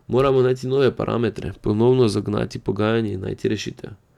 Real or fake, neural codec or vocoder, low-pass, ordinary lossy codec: real; none; 19.8 kHz; none